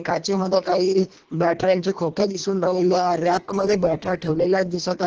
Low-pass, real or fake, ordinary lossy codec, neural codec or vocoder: 7.2 kHz; fake; Opus, 16 kbps; codec, 24 kHz, 1.5 kbps, HILCodec